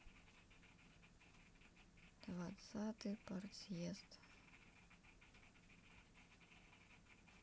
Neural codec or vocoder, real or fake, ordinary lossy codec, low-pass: none; real; none; none